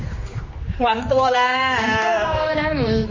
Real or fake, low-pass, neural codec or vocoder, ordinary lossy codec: fake; 7.2 kHz; codec, 16 kHz, 2 kbps, X-Codec, HuBERT features, trained on general audio; MP3, 32 kbps